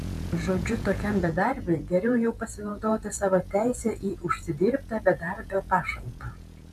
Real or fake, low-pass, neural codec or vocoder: fake; 14.4 kHz; vocoder, 48 kHz, 128 mel bands, Vocos